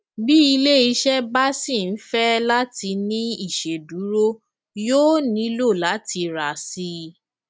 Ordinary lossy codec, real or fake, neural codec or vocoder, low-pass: none; real; none; none